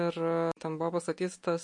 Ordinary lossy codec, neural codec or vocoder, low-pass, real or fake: MP3, 48 kbps; none; 10.8 kHz; real